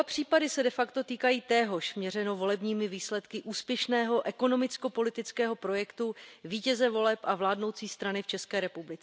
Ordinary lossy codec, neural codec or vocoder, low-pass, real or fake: none; none; none; real